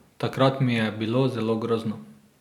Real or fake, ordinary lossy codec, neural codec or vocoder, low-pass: real; none; none; 19.8 kHz